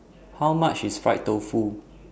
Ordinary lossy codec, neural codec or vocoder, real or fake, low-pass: none; none; real; none